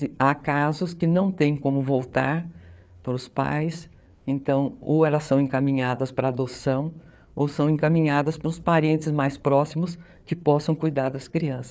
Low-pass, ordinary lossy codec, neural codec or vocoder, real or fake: none; none; codec, 16 kHz, 4 kbps, FreqCodec, larger model; fake